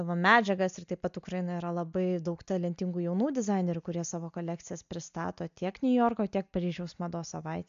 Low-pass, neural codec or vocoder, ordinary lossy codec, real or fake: 7.2 kHz; none; MP3, 64 kbps; real